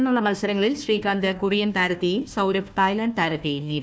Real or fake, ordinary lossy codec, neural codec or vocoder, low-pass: fake; none; codec, 16 kHz, 1 kbps, FunCodec, trained on Chinese and English, 50 frames a second; none